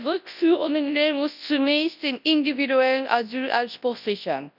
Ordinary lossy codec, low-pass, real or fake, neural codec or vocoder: none; 5.4 kHz; fake; codec, 24 kHz, 0.9 kbps, WavTokenizer, large speech release